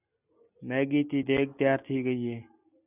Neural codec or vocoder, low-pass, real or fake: none; 3.6 kHz; real